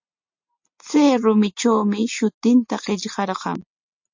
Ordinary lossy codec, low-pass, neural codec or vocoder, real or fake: MP3, 48 kbps; 7.2 kHz; none; real